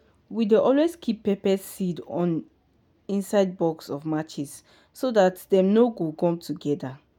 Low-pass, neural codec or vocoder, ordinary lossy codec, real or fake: 19.8 kHz; none; none; real